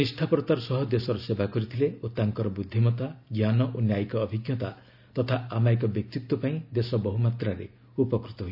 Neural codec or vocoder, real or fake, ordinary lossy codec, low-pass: none; real; none; 5.4 kHz